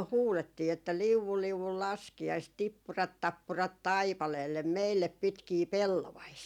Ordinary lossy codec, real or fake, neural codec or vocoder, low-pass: none; real; none; 19.8 kHz